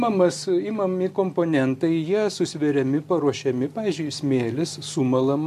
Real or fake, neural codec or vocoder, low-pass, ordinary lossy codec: real; none; 14.4 kHz; MP3, 64 kbps